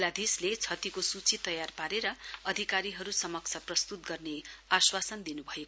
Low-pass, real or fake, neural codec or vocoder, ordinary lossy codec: none; real; none; none